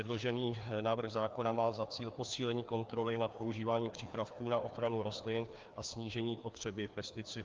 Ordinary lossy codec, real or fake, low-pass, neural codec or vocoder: Opus, 32 kbps; fake; 7.2 kHz; codec, 16 kHz, 2 kbps, FreqCodec, larger model